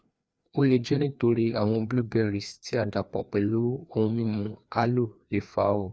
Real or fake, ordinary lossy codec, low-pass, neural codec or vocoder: fake; none; none; codec, 16 kHz, 2 kbps, FreqCodec, larger model